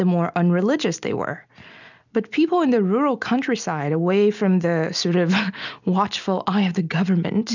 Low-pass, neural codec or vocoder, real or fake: 7.2 kHz; none; real